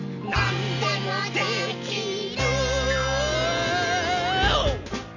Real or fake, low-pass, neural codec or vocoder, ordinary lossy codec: real; 7.2 kHz; none; none